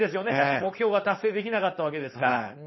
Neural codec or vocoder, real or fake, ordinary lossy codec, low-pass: codec, 16 kHz, 4.8 kbps, FACodec; fake; MP3, 24 kbps; 7.2 kHz